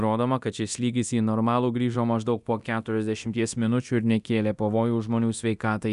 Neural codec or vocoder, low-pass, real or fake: codec, 24 kHz, 0.9 kbps, DualCodec; 10.8 kHz; fake